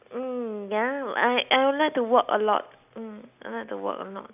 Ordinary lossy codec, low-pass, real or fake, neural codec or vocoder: none; 3.6 kHz; real; none